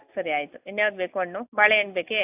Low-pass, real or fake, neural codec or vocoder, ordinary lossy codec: 3.6 kHz; real; none; AAC, 32 kbps